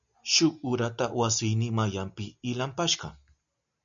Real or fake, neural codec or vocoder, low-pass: real; none; 7.2 kHz